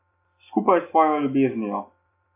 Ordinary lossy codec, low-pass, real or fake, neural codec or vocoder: AAC, 24 kbps; 3.6 kHz; real; none